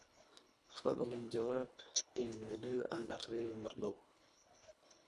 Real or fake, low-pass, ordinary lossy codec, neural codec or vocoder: fake; none; none; codec, 24 kHz, 1.5 kbps, HILCodec